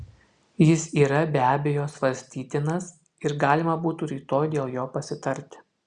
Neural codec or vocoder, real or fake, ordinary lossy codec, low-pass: none; real; Opus, 64 kbps; 9.9 kHz